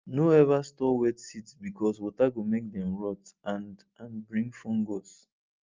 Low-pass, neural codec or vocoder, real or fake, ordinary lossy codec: 7.2 kHz; none; real; Opus, 24 kbps